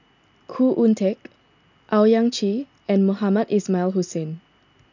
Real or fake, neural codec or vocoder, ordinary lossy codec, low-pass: real; none; none; 7.2 kHz